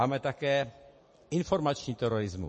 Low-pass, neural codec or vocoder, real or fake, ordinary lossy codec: 10.8 kHz; none; real; MP3, 32 kbps